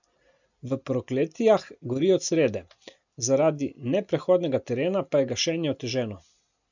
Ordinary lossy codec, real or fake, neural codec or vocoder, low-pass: none; real; none; 7.2 kHz